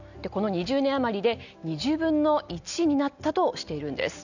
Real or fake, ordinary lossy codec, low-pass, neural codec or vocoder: real; none; 7.2 kHz; none